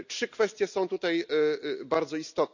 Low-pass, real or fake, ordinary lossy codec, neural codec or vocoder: 7.2 kHz; real; none; none